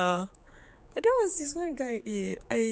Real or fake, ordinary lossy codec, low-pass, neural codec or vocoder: fake; none; none; codec, 16 kHz, 4 kbps, X-Codec, HuBERT features, trained on general audio